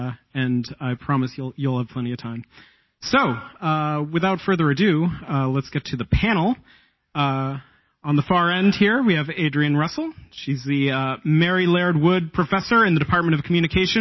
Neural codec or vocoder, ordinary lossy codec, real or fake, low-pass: none; MP3, 24 kbps; real; 7.2 kHz